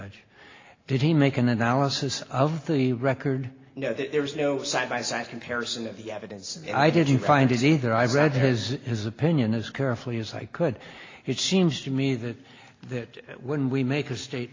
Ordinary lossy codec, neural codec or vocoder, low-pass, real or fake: AAC, 32 kbps; none; 7.2 kHz; real